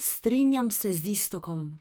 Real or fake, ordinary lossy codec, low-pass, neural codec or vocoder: fake; none; none; codec, 44.1 kHz, 2.6 kbps, SNAC